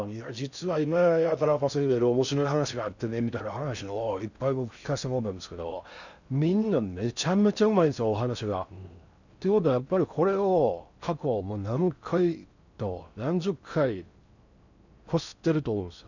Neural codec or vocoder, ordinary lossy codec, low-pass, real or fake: codec, 16 kHz in and 24 kHz out, 0.6 kbps, FocalCodec, streaming, 4096 codes; none; 7.2 kHz; fake